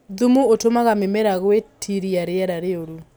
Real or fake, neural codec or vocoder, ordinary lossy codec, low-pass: real; none; none; none